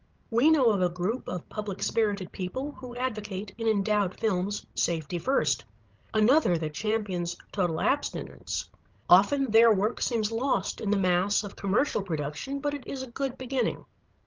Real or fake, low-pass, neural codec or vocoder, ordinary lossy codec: fake; 7.2 kHz; codec, 16 kHz, 16 kbps, FreqCodec, larger model; Opus, 32 kbps